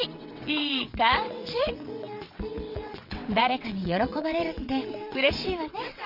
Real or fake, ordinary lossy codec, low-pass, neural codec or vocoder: fake; none; 5.4 kHz; vocoder, 22.05 kHz, 80 mel bands, WaveNeXt